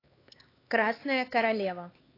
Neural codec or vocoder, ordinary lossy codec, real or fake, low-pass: codec, 16 kHz, 4 kbps, X-Codec, HuBERT features, trained on LibriSpeech; AAC, 24 kbps; fake; 5.4 kHz